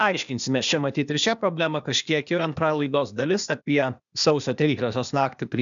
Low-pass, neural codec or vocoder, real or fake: 7.2 kHz; codec, 16 kHz, 0.8 kbps, ZipCodec; fake